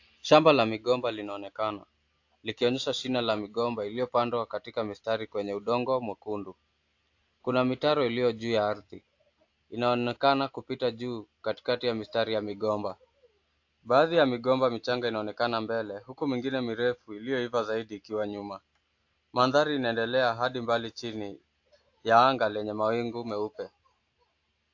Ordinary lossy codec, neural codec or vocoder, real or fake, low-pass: AAC, 48 kbps; none; real; 7.2 kHz